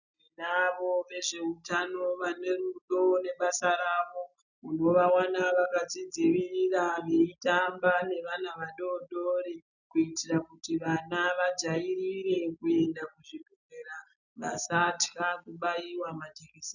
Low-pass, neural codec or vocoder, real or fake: 7.2 kHz; none; real